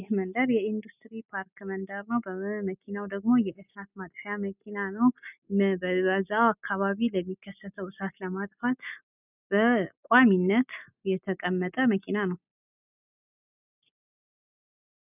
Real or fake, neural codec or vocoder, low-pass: real; none; 3.6 kHz